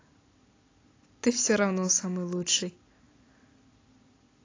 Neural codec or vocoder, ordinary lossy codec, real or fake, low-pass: none; AAC, 32 kbps; real; 7.2 kHz